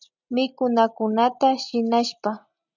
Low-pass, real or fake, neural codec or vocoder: 7.2 kHz; real; none